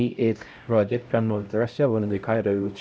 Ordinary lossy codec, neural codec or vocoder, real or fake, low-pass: none; codec, 16 kHz, 0.5 kbps, X-Codec, HuBERT features, trained on LibriSpeech; fake; none